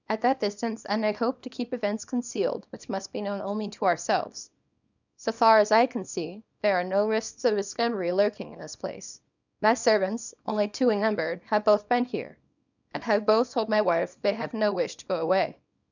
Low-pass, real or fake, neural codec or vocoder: 7.2 kHz; fake; codec, 24 kHz, 0.9 kbps, WavTokenizer, small release